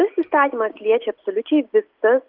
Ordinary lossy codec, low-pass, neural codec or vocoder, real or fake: Opus, 32 kbps; 5.4 kHz; none; real